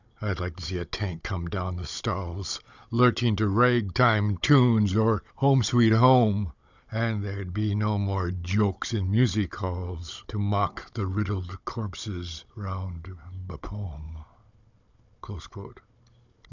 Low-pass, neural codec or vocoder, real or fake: 7.2 kHz; codec, 16 kHz, 16 kbps, FunCodec, trained on Chinese and English, 50 frames a second; fake